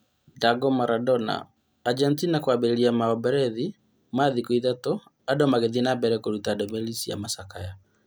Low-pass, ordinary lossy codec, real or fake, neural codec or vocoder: none; none; real; none